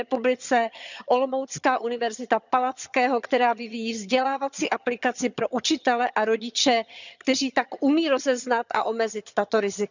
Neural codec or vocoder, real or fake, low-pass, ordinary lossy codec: vocoder, 22.05 kHz, 80 mel bands, HiFi-GAN; fake; 7.2 kHz; none